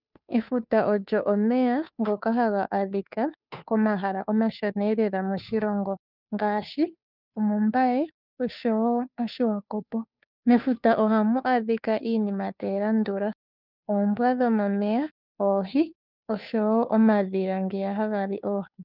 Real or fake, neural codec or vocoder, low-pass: fake; codec, 16 kHz, 2 kbps, FunCodec, trained on Chinese and English, 25 frames a second; 5.4 kHz